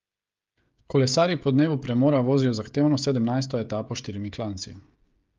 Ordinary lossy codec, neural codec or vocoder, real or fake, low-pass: Opus, 32 kbps; codec, 16 kHz, 16 kbps, FreqCodec, smaller model; fake; 7.2 kHz